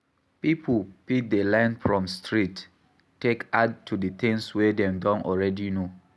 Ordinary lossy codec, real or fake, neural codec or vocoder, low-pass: none; real; none; none